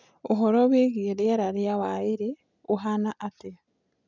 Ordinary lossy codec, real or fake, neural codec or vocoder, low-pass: none; real; none; 7.2 kHz